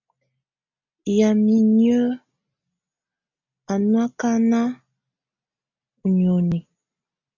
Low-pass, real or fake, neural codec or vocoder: 7.2 kHz; real; none